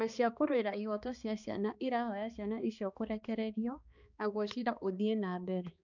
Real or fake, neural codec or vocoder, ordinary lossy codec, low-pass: fake; codec, 16 kHz, 2 kbps, X-Codec, HuBERT features, trained on balanced general audio; none; 7.2 kHz